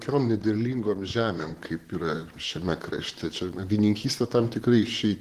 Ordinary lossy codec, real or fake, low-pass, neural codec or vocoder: Opus, 16 kbps; fake; 14.4 kHz; vocoder, 44.1 kHz, 128 mel bands, Pupu-Vocoder